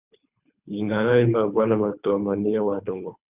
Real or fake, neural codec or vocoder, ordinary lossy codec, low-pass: fake; codec, 24 kHz, 3 kbps, HILCodec; Opus, 64 kbps; 3.6 kHz